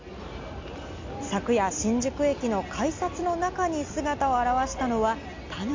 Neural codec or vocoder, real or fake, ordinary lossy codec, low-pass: none; real; MP3, 64 kbps; 7.2 kHz